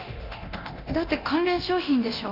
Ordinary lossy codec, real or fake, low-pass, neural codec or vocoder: none; fake; 5.4 kHz; codec, 24 kHz, 0.9 kbps, DualCodec